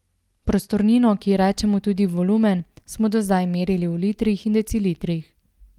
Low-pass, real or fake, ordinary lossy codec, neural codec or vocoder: 19.8 kHz; real; Opus, 32 kbps; none